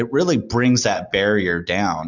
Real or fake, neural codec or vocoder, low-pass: real; none; 7.2 kHz